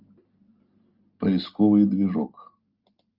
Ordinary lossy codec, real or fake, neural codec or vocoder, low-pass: Opus, 24 kbps; real; none; 5.4 kHz